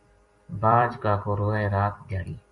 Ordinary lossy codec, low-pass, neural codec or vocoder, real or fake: MP3, 48 kbps; 10.8 kHz; vocoder, 44.1 kHz, 128 mel bands every 256 samples, BigVGAN v2; fake